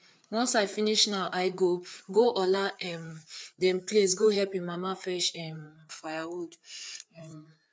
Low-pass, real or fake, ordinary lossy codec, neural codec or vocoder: none; fake; none; codec, 16 kHz, 4 kbps, FreqCodec, larger model